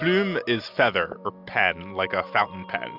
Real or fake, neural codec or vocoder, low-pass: real; none; 5.4 kHz